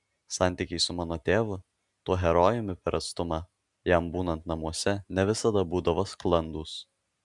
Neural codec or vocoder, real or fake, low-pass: none; real; 10.8 kHz